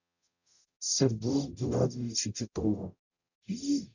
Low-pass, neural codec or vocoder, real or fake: 7.2 kHz; codec, 44.1 kHz, 0.9 kbps, DAC; fake